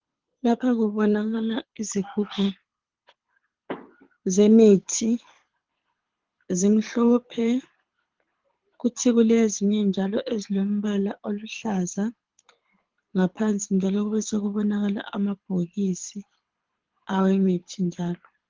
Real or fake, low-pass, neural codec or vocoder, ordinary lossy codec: fake; 7.2 kHz; codec, 24 kHz, 6 kbps, HILCodec; Opus, 16 kbps